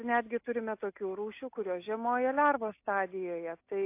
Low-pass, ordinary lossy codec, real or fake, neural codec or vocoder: 3.6 kHz; AAC, 24 kbps; real; none